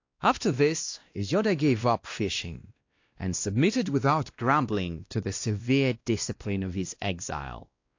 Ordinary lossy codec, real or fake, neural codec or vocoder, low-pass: AAC, 48 kbps; fake; codec, 16 kHz, 1 kbps, X-Codec, WavLM features, trained on Multilingual LibriSpeech; 7.2 kHz